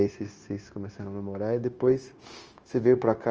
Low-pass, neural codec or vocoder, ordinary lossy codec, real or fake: 7.2 kHz; codec, 16 kHz, 0.9 kbps, LongCat-Audio-Codec; Opus, 24 kbps; fake